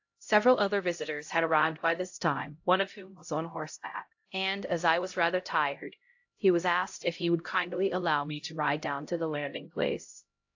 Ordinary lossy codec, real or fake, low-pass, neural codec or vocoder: AAC, 48 kbps; fake; 7.2 kHz; codec, 16 kHz, 0.5 kbps, X-Codec, HuBERT features, trained on LibriSpeech